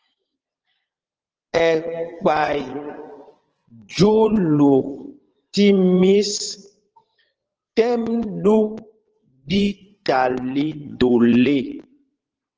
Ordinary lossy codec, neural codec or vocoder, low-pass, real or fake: Opus, 16 kbps; vocoder, 22.05 kHz, 80 mel bands, Vocos; 7.2 kHz; fake